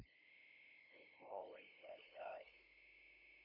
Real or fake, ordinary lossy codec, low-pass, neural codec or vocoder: fake; none; 5.4 kHz; codec, 16 kHz, 0.5 kbps, FunCodec, trained on LibriTTS, 25 frames a second